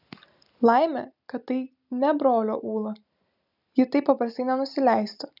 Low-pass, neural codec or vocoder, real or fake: 5.4 kHz; none; real